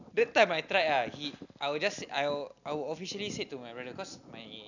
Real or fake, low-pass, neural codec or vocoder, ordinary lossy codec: real; 7.2 kHz; none; none